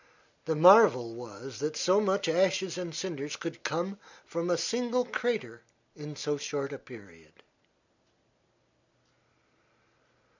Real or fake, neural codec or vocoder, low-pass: real; none; 7.2 kHz